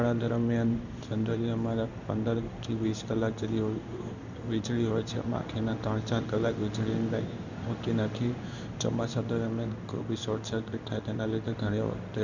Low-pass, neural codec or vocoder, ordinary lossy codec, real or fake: 7.2 kHz; codec, 16 kHz in and 24 kHz out, 1 kbps, XY-Tokenizer; none; fake